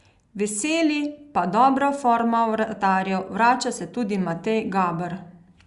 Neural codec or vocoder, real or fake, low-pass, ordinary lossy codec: none; real; 10.8 kHz; Opus, 64 kbps